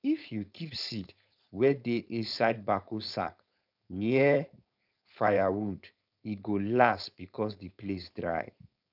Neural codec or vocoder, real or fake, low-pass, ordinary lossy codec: codec, 16 kHz, 4.8 kbps, FACodec; fake; 5.4 kHz; none